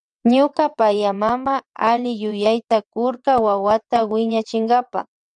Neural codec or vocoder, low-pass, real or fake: vocoder, 22.05 kHz, 80 mel bands, WaveNeXt; 9.9 kHz; fake